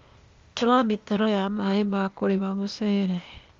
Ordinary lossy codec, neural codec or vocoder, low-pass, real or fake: Opus, 32 kbps; codec, 16 kHz, 0.8 kbps, ZipCodec; 7.2 kHz; fake